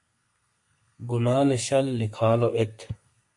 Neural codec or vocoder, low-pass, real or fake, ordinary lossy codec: codec, 32 kHz, 1.9 kbps, SNAC; 10.8 kHz; fake; MP3, 48 kbps